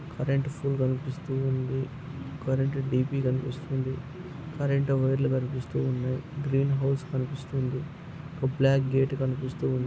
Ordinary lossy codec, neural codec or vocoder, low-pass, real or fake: none; none; none; real